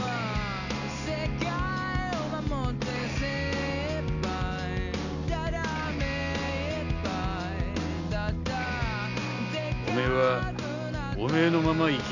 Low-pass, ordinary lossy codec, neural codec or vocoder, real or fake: 7.2 kHz; none; none; real